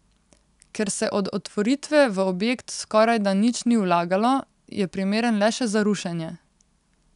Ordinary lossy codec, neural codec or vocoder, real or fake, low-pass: none; none; real; 10.8 kHz